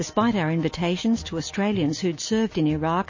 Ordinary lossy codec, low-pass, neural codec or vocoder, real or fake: MP3, 32 kbps; 7.2 kHz; none; real